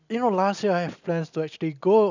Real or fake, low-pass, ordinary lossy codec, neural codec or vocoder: real; 7.2 kHz; none; none